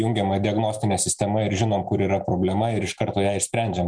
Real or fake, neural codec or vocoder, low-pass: real; none; 9.9 kHz